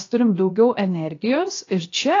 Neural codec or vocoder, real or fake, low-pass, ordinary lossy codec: codec, 16 kHz, 0.7 kbps, FocalCodec; fake; 7.2 kHz; AAC, 32 kbps